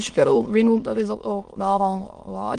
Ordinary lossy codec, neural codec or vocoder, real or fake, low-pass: Opus, 24 kbps; autoencoder, 22.05 kHz, a latent of 192 numbers a frame, VITS, trained on many speakers; fake; 9.9 kHz